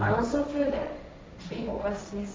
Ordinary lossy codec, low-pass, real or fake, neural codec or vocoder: none; none; fake; codec, 16 kHz, 1.1 kbps, Voila-Tokenizer